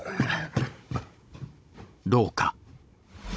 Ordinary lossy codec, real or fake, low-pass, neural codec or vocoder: none; fake; none; codec, 16 kHz, 16 kbps, FunCodec, trained on Chinese and English, 50 frames a second